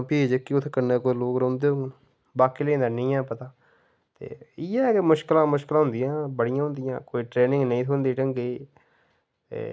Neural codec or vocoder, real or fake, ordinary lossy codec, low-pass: none; real; none; none